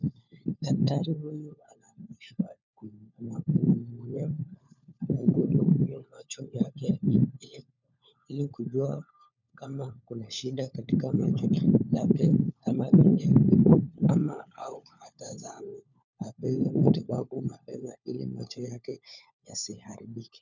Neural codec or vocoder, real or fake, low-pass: codec, 16 kHz, 4 kbps, FunCodec, trained on LibriTTS, 50 frames a second; fake; 7.2 kHz